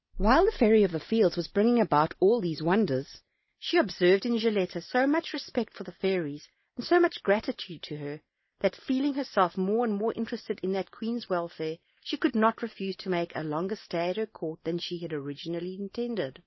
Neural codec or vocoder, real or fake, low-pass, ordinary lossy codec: none; real; 7.2 kHz; MP3, 24 kbps